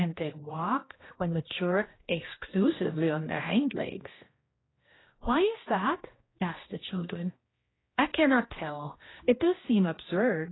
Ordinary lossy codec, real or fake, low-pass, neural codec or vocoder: AAC, 16 kbps; fake; 7.2 kHz; codec, 16 kHz, 1 kbps, X-Codec, HuBERT features, trained on general audio